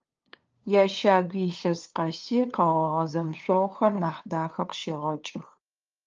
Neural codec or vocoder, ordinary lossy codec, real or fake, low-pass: codec, 16 kHz, 2 kbps, FunCodec, trained on LibriTTS, 25 frames a second; Opus, 24 kbps; fake; 7.2 kHz